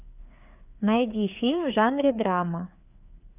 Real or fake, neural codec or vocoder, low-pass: fake; codec, 16 kHz, 4 kbps, FreqCodec, larger model; 3.6 kHz